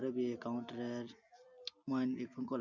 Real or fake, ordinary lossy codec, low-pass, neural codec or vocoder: real; none; 7.2 kHz; none